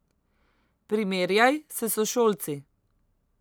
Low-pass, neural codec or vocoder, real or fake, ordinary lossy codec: none; none; real; none